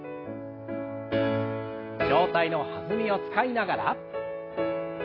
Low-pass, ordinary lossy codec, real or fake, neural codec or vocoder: 5.4 kHz; AAC, 24 kbps; real; none